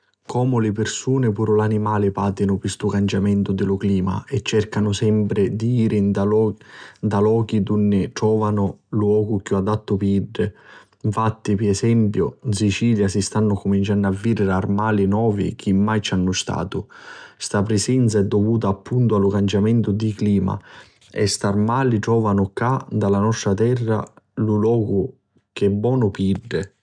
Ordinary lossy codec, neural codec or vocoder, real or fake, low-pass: none; none; real; 9.9 kHz